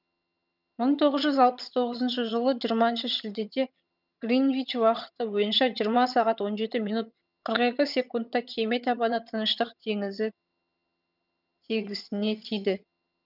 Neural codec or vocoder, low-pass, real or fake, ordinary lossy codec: vocoder, 22.05 kHz, 80 mel bands, HiFi-GAN; 5.4 kHz; fake; none